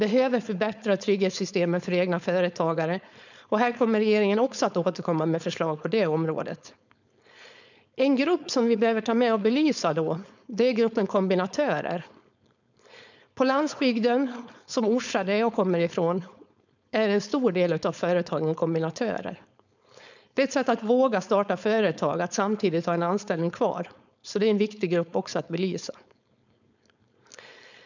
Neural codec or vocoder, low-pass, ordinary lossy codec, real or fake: codec, 16 kHz, 4.8 kbps, FACodec; 7.2 kHz; none; fake